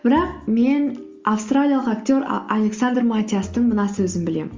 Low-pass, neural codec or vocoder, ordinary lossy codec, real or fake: 7.2 kHz; none; Opus, 32 kbps; real